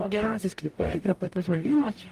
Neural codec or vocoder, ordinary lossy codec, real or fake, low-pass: codec, 44.1 kHz, 0.9 kbps, DAC; Opus, 32 kbps; fake; 14.4 kHz